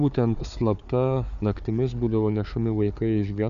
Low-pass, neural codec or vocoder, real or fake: 7.2 kHz; codec, 16 kHz, 4 kbps, FunCodec, trained on Chinese and English, 50 frames a second; fake